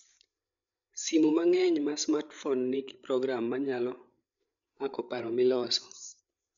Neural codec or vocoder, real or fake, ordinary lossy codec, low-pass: codec, 16 kHz, 8 kbps, FreqCodec, larger model; fake; none; 7.2 kHz